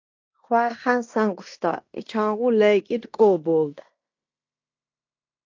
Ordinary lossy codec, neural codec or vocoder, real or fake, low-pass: AAC, 48 kbps; codec, 16 kHz in and 24 kHz out, 0.9 kbps, LongCat-Audio-Codec, fine tuned four codebook decoder; fake; 7.2 kHz